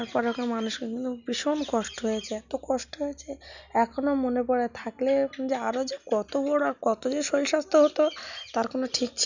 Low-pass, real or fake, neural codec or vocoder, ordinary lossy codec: 7.2 kHz; real; none; none